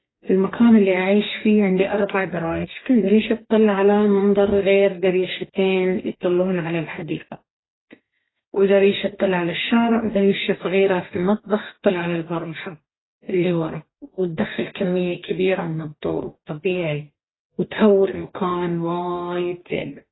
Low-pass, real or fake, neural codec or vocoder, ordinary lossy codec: 7.2 kHz; fake; codec, 44.1 kHz, 2.6 kbps, DAC; AAC, 16 kbps